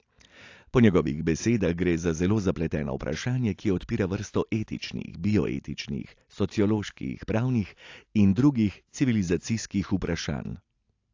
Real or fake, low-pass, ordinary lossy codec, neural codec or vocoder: real; 7.2 kHz; AAC, 48 kbps; none